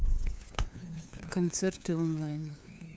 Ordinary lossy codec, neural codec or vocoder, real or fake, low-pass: none; codec, 16 kHz, 2 kbps, FunCodec, trained on LibriTTS, 25 frames a second; fake; none